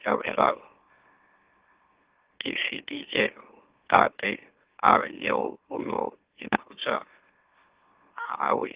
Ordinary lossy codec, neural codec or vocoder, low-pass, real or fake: Opus, 16 kbps; autoencoder, 44.1 kHz, a latent of 192 numbers a frame, MeloTTS; 3.6 kHz; fake